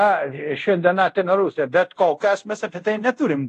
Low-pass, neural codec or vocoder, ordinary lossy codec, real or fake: 10.8 kHz; codec, 24 kHz, 0.5 kbps, DualCodec; AAC, 48 kbps; fake